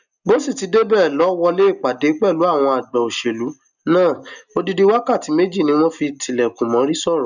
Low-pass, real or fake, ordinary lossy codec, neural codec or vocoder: 7.2 kHz; real; none; none